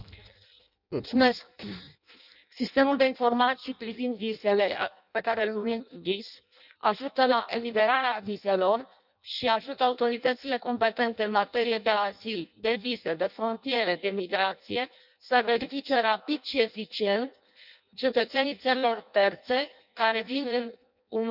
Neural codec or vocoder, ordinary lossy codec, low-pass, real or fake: codec, 16 kHz in and 24 kHz out, 0.6 kbps, FireRedTTS-2 codec; none; 5.4 kHz; fake